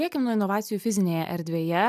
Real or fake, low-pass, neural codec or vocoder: real; 14.4 kHz; none